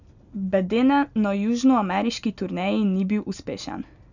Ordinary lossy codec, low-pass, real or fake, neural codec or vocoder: none; 7.2 kHz; real; none